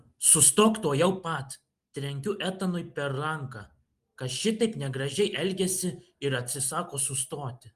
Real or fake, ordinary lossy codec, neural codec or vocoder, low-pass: real; Opus, 24 kbps; none; 14.4 kHz